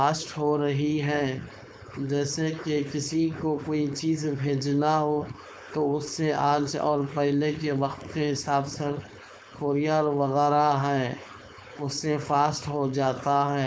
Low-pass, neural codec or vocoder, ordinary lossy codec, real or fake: none; codec, 16 kHz, 4.8 kbps, FACodec; none; fake